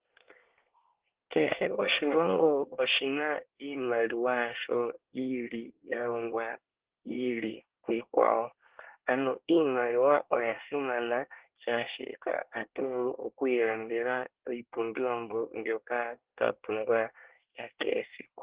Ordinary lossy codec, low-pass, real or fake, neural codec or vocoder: Opus, 16 kbps; 3.6 kHz; fake; codec, 24 kHz, 1 kbps, SNAC